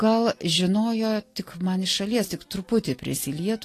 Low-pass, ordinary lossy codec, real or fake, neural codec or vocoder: 14.4 kHz; AAC, 48 kbps; real; none